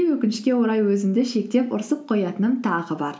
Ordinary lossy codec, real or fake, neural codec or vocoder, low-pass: none; real; none; none